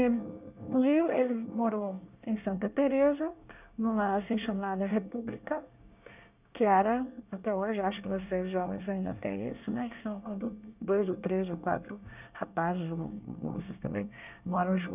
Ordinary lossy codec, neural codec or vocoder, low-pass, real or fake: none; codec, 24 kHz, 1 kbps, SNAC; 3.6 kHz; fake